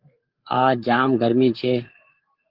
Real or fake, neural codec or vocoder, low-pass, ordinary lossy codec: fake; codec, 16 kHz, 8 kbps, FreqCodec, larger model; 5.4 kHz; Opus, 16 kbps